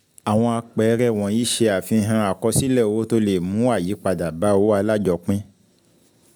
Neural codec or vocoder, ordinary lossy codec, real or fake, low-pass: none; none; real; none